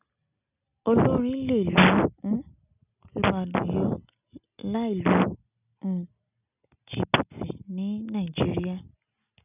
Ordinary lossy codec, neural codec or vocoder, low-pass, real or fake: none; none; 3.6 kHz; real